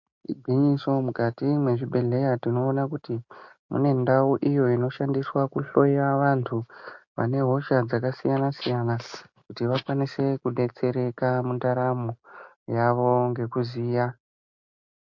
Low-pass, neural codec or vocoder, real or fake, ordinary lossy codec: 7.2 kHz; none; real; MP3, 48 kbps